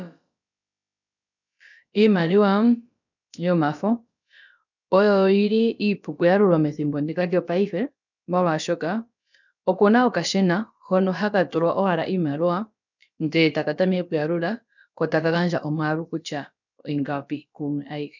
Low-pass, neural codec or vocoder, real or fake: 7.2 kHz; codec, 16 kHz, about 1 kbps, DyCAST, with the encoder's durations; fake